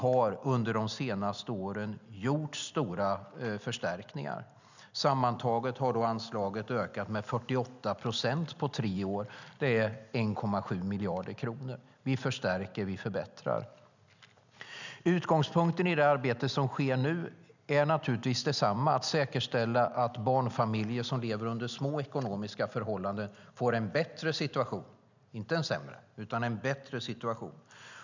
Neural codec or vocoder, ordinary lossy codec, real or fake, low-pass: none; none; real; 7.2 kHz